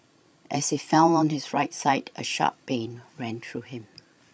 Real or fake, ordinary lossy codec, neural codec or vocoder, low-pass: fake; none; codec, 16 kHz, 16 kbps, FreqCodec, larger model; none